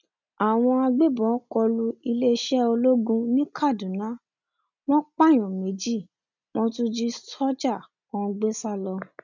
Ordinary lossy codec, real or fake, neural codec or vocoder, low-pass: none; real; none; 7.2 kHz